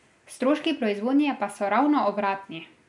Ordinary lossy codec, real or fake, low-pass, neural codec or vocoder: none; real; 10.8 kHz; none